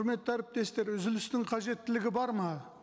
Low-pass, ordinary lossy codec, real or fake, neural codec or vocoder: none; none; real; none